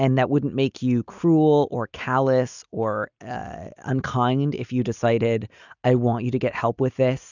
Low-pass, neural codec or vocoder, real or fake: 7.2 kHz; none; real